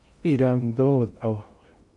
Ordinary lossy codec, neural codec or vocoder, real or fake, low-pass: MP3, 48 kbps; codec, 16 kHz in and 24 kHz out, 0.6 kbps, FocalCodec, streaming, 2048 codes; fake; 10.8 kHz